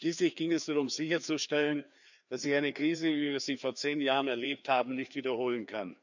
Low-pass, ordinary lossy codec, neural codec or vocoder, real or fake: 7.2 kHz; none; codec, 16 kHz, 2 kbps, FreqCodec, larger model; fake